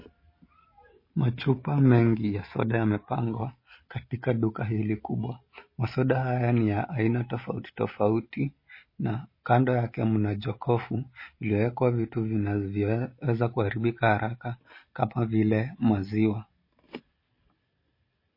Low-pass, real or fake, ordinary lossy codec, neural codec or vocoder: 5.4 kHz; real; MP3, 24 kbps; none